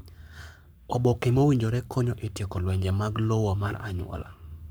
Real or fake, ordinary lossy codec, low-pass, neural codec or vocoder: fake; none; none; codec, 44.1 kHz, 7.8 kbps, Pupu-Codec